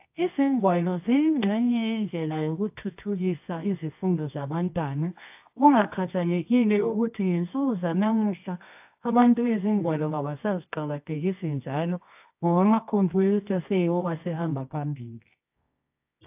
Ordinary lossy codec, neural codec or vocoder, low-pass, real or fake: AAC, 32 kbps; codec, 24 kHz, 0.9 kbps, WavTokenizer, medium music audio release; 3.6 kHz; fake